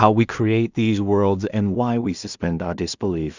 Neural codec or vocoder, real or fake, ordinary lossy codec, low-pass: codec, 16 kHz in and 24 kHz out, 0.4 kbps, LongCat-Audio-Codec, two codebook decoder; fake; Opus, 64 kbps; 7.2 kHz